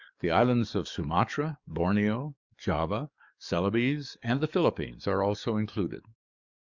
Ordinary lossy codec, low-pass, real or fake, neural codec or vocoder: Opus, 64 kbps; 7.2 kHz; fake; codec, 44.1 kHz, 7.8 kbps, DAC